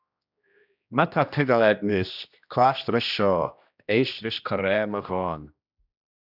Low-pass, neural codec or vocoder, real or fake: 5.4 kHz; codec, 16 kHz, 1 kbps, X-Codec, HuBERT features, trained on general audio; fake